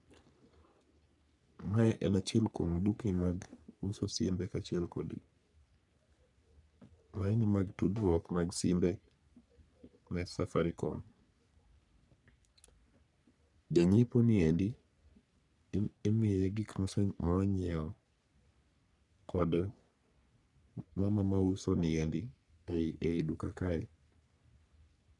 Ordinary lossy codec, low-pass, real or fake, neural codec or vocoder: none; 10.8 kHz; fake; codec, 44.1 kHz, 3.4 kbps, Pupu-Codec